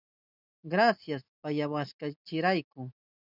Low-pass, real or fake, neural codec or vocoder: 5.4 kHz; real; none